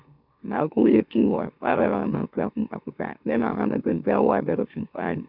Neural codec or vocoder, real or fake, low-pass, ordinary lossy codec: autoencoder, 44.1 kHz, a latent of 192 numbers a frame, MeloTTS; fake; 5.4 kHz; none